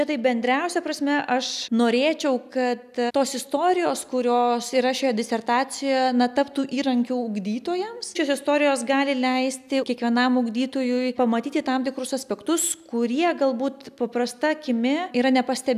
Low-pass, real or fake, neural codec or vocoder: 14.4 kHz; real; none